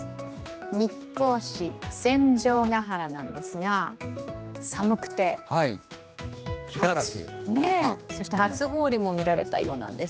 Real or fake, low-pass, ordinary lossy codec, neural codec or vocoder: fake; none; none; codec, 16 kHz, 2 kbps, X-Codec, HuBERT features, trained on general audio